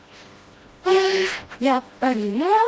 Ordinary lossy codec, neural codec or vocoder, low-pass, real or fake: none; codec, 16 kHz, 1 kbps, FreqCodec, smaller model; none; fake